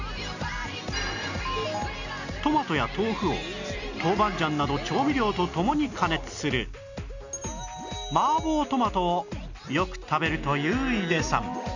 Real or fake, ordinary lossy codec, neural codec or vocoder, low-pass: real; none; none; 7.2 kHz